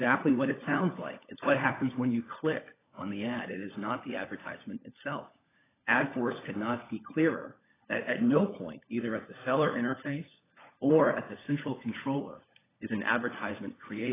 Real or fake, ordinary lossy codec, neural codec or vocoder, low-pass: fake; AAC, 16 kbps; codec, 16 kHz, 16 kbps, FunCodec, trained on LibriTTS, 50 frames a second; 3.6 kHz